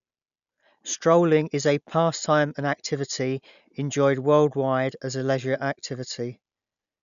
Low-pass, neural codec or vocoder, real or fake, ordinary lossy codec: 7.2 kHz; none; real; none